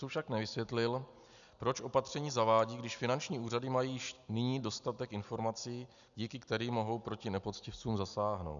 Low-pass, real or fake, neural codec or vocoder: 7.2 kHz; real; none